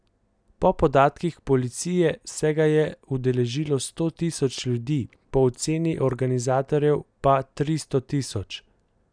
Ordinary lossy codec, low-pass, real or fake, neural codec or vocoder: none; 9.9 kHz; real; none